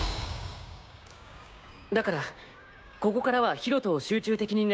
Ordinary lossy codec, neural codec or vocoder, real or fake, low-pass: none; codec, 16 kHz, 6 kbps, DAC; fake; none